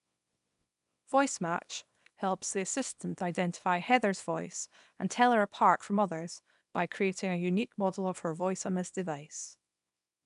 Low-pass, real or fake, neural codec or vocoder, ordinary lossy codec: 10.8 kHz; fake; codec, 24 kHz, 0.9 kbps, WavTokenizer, small release; none